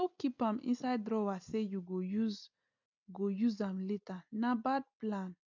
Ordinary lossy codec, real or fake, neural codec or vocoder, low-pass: none; real; none; 7.2 kHz